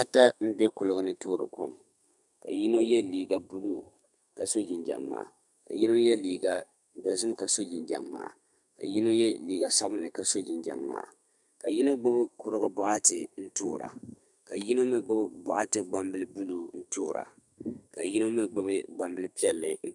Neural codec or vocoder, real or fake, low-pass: codec, 32 kHz, 1.9 kbps, SNAC; fake; 10.8 kHz